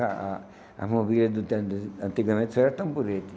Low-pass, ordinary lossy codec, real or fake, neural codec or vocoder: none; none; real; none